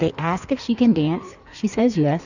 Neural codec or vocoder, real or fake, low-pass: codec, 16 kHz in and 24 kHz out, 1.1 kbps, FireRedTTS-2 codec; fake; 7.2 kHz